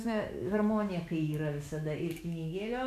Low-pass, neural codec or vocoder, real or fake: 14.4 kHz; autoencoder, 48 kHz, 128 numbers a frame, DAC-VAE, trained on Japanese speech; fake